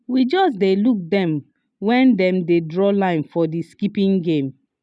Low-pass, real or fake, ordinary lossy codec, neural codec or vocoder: none; real; none; none